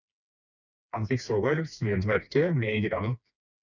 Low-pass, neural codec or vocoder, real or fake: 7.2 kHz; codec, 16 kHz, 2 kbps, FreqCodec, smaller model; fake